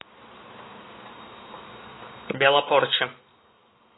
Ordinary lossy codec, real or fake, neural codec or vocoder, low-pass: AAC, 16 kbps; real; none; 7.2 kHz